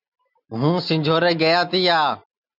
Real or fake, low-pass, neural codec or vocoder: real; 5.4 kHz; none